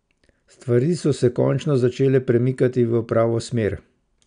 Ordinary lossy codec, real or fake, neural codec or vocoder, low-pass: none; real; none; 9.9 kHz